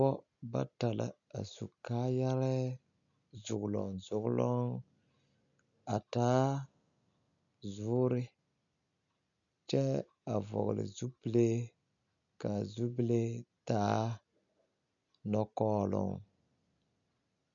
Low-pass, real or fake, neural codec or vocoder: 7.2 kHz; real; none